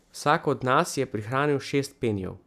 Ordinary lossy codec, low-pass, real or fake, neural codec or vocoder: none; 14.4 kHz; real; none